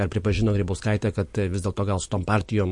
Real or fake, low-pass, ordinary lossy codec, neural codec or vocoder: fake; 10.8 kHz; MP3, 48 kbps; vocoder, 44.1 kHz, 128 mel bands every 256 samples, BigVGAN v2